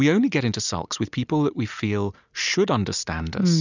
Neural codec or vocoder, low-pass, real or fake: none; 7.2 kHz; real